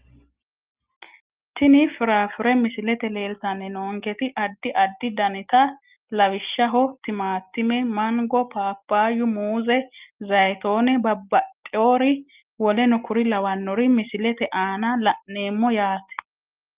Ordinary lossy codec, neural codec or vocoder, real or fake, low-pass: Opus, 32 kbps; none; real; 3.6 kHz